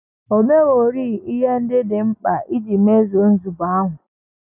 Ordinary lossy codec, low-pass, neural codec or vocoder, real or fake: none; 3.6 kHz; none; real